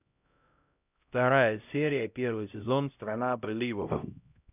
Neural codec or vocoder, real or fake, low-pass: codec, 16 kHz, 0.5 kbps, X-Codec, HuBERT features, trained on LibriSpeech; fake; 3.6 kHz